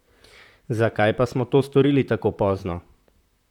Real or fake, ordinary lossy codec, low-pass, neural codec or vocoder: fake; none; 19.8 kHz; vocoder, 44.1 kHz, 128 mel bands, Pupu-Vocoder